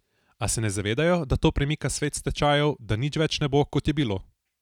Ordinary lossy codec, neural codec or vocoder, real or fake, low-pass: none; none; real; 19.8 kHz